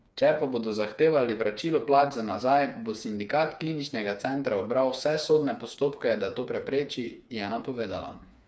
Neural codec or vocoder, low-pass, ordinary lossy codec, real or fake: codec, 16 kHz, 4 kbps, FreqCodec, smaller model; none; none; fake